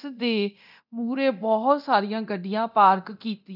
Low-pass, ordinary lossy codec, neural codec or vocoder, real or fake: 5.4 kHz; none; codec, 24 kHz, 0.9 kbps, DualCodec; fake